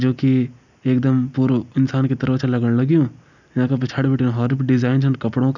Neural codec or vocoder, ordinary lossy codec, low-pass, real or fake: none; none; 7.2 kHz; real